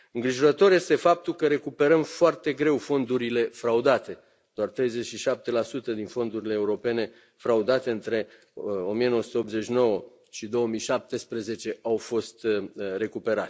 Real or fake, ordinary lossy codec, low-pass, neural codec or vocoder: real; none; none; none